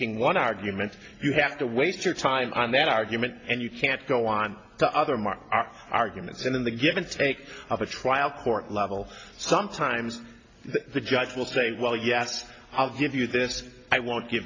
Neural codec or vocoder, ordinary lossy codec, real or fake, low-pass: none; AAC, 32 kbps; real; 7.2 kHz